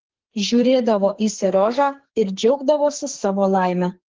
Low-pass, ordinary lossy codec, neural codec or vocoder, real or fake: 7.2 kHz; Opus, 16 kbps; codec, 44.1 kHz, 2.6 kbps, SNAC; fake